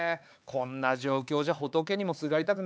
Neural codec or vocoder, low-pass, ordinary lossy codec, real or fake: codec, 16 kHz, 4 kbps, X-Codec, HuBERT features, trained on LibriSpeech; none; none; fake